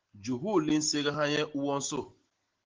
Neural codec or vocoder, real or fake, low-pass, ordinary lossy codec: none; real; 7.2 kHz; Opus, 16 kbps